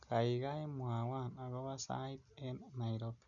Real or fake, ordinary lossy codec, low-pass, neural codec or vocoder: real; none; 7.2 kHz; none